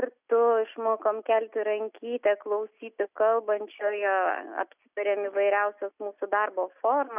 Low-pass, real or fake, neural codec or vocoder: 3.6 kHz; real; none